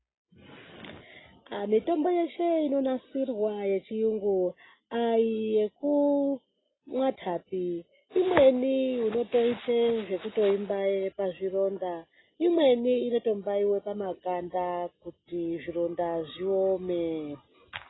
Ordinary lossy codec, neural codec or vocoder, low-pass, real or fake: AAC, 16 kbps; none; 7.2 kHz; real